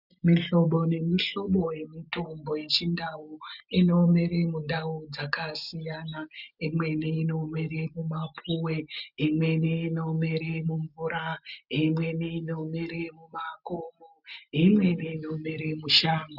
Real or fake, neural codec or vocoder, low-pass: real; none; 5.4 kHz